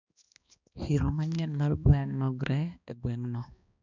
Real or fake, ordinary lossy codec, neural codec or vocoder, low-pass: fake; none; codec, 16 kHz, 2 kbps, X-Codec, HuBERT features, trained on balanced general audio; 7.2 kHz